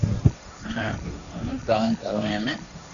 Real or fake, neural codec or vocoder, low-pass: fake; codec, 16 kHz, 1.1 kbps, Voila-Tokenizer; 7.2 kHz